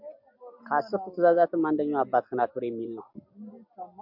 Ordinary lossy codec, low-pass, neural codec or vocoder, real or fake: MP3, 48 kbps; 5.4 kHz; none; real